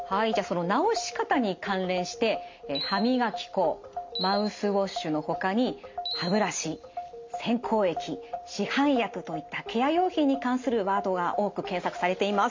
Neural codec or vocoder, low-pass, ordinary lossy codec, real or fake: none; 7.2 kHz; MP3, 48 kbps; real